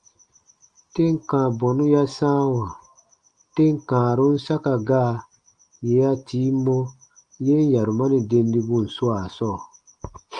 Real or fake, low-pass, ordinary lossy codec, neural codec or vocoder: real; 9.9 kHz; Opus, 24 kbps; none